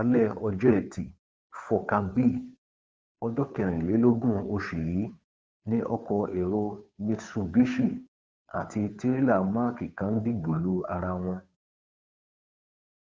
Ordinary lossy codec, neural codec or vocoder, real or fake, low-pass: none; codec, 16 kHz, 2 kbps, FunCodec, trained on Chinese and English, 25 frames a second; fake; none